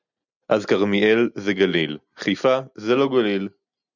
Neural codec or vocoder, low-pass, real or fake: none; 7.2 kHz; real